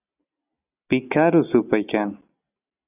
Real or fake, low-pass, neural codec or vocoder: real; 3.6 kHz; none